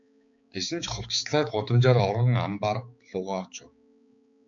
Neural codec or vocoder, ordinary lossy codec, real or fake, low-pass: codec, 16 kHz, 4 kbps, X-Codec, HuBERT features, trained on balanced general audio; MP3, 64 kbps; fake; 7.2 kHz